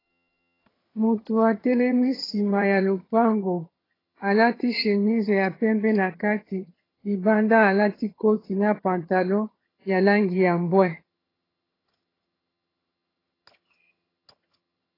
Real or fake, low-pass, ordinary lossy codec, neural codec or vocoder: fake; 5.4 kHz; AAC, 24 kbps; vocoder, 22.05 kHz, 80 mel bands, HiFi-GAN